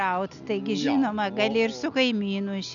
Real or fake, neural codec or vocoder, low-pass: real; none; 7.2 kHz